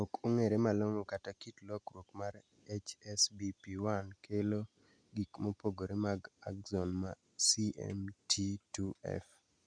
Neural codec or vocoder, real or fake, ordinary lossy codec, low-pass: none; real; none; 9.9 kHz